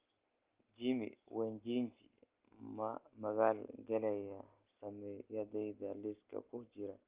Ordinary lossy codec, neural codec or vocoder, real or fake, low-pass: Opus, 32 kbps; none; real; 3.6 kHz